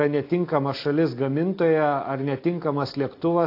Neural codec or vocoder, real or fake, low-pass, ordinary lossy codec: none; real; 5.4 kHz; AAC, 32 kbps